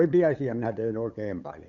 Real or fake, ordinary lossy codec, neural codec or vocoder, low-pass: fake; none; codec, 16 kHz, 8 kbps, FunCodec, trained on LibriTTS, 25 frames a second; 7.2 kHz